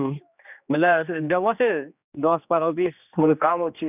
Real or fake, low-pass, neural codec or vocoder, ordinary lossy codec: fake; 3.6 kHz; codec, 16 kHz, 2 kbps, X-Codec, HuBERT features, trained on general audio; none